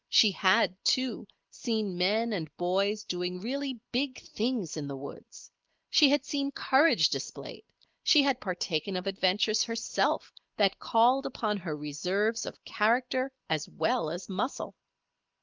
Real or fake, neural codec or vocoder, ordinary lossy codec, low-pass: real; none; Opus, 32 kbps; 7.2 kHz